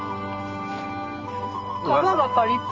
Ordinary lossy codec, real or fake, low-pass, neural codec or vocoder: Opus, 24 kbps; real; 7.2 kHz; none